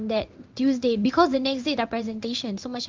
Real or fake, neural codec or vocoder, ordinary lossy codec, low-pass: fake; codec, 16 kHz in and 24 kHz out, 1 kbps, XY-Tokenizer; Opus, 32 kbps; 7.2 kHz